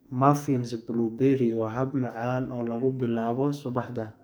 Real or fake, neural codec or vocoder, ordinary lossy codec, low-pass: fake; codec, 44.1 kHz, 2.6 kbps, SNAC; none; none